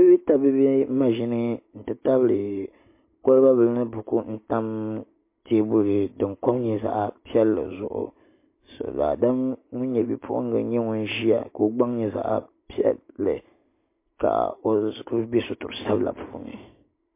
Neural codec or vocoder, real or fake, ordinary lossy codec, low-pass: none; real; MP3, 24 kbps; 3.6 kHz